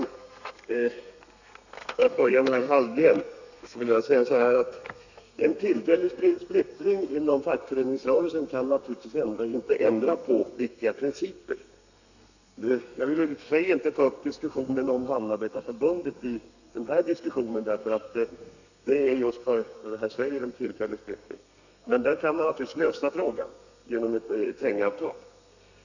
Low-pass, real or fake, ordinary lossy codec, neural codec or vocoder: 7.2 kHz; fake; none; codec, 32 kHz, 1.9 kbps, SNAC